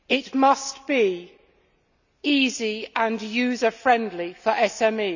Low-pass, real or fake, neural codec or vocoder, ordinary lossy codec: 7.2 kHz; real; none; none